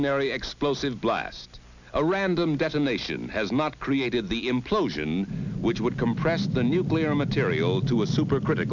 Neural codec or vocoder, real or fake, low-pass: none; real; 7.2 kHz